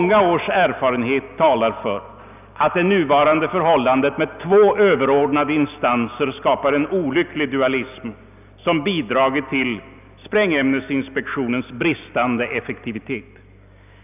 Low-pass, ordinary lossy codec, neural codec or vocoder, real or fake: 3.6 kHz; none; none; real